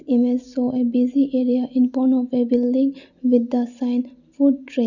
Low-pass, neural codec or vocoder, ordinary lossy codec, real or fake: 7.2 kHz; none; none; real